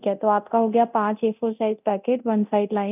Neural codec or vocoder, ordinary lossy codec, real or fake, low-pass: codec, 24 kHz, 0.9 kbps, DualCodec; none; fake; 3.6 kHz